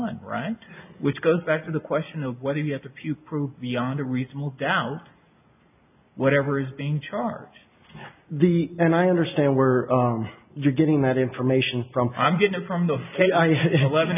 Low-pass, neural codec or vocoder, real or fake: 3.6 kHz; none; real